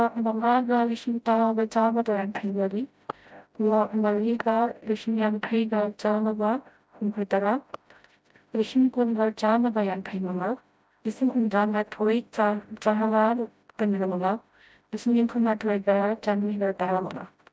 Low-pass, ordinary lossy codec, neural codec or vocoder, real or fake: none; none; codec, 16 kHz, 0.5 kbps, FreqCodec, smaller model; fake